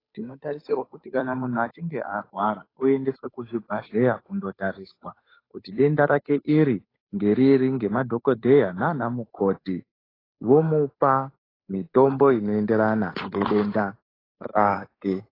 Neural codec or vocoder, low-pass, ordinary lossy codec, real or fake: codec, 16 kHz, 8 kbps, FunCodec, trained on Chinese and English, 25 frames a second; 5.4 kHz; AAC, 24 kbps; fake